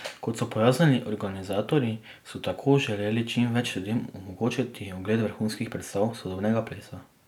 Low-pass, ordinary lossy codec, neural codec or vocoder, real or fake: 19.8 kHz; none; none; real